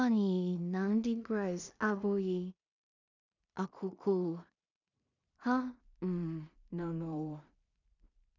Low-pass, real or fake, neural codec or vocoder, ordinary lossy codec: 7.2 kHz; fake; codec, 16 kHz in and 24 kHz out, 0.4 kbps, LongCat-Audio-Codec, two codebook decoder; none